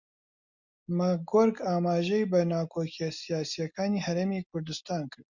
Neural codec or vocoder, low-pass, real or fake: none; 7.2 kHz; real